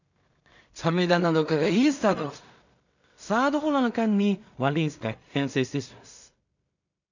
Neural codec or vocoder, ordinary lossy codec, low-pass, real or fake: codec, 16 kHz in and 24 kHz out, 0.4 kbps, LongCat-Audio-Codec, two codebook decoder; none; 7.2 kHz; fake